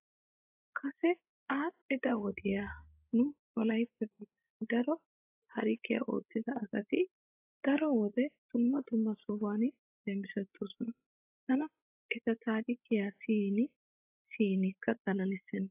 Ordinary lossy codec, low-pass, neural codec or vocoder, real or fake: AAC, 32 kbps; 3.6 kHz; codec, 16 kHz, 16 kbps, FreqCodec, larger model; fake